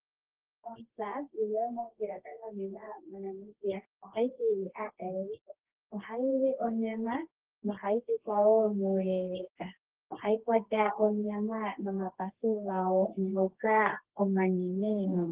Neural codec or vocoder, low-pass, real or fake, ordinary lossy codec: codec, 24 kHz, 0.9 kbps, WavTokenizer, medium music audio release; 3.6 kHz; fake; Opus, 16 kbps